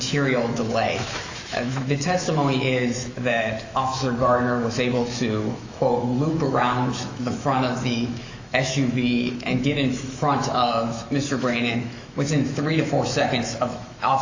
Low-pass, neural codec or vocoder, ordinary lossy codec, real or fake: 7.2 kHz; autoencoder, 48 kHz, 128 numbers a frame, DAC-VAE, trained on Japanese speech; AAC, 48 kbps; fake